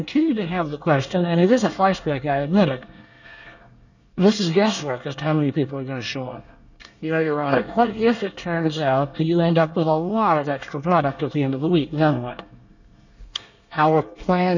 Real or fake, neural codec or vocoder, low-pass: fake; codec, 24 kHz, 1 kbps, SNAC; 7.2 kHz